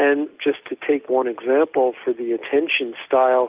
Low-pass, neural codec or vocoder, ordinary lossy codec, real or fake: 3.6 kHz; none; Opus, 32 kbps; real